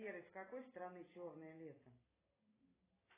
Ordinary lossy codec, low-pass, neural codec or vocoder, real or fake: AAC, 16 kbps; 3.6 kHz; none; real